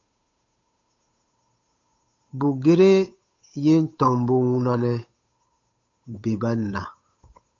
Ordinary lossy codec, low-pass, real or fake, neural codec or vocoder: AAC, 48 kbps; 7.2 kHz; fake; codec, 16 kHz, 8 kbps, FunCodec, trained on Chinese and English, 25 frames a second